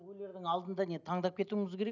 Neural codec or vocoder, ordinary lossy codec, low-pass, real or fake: none; none; 7.2 kHz; real